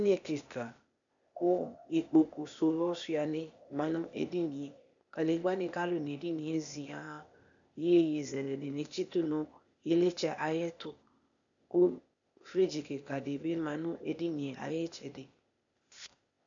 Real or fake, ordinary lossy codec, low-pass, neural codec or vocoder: fake; MP3, 96 kbps; 7.2 kHz; codec, 16 kHz, 0.8 kbps, ZipCodec